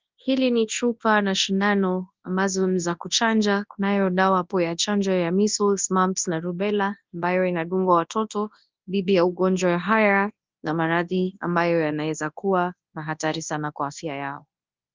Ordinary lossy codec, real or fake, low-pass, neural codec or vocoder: Opus, 24 kbps; fake; 7.2 kHz; codec, 24 kHz, 0.9 kbps, WavTokenizer, large speech release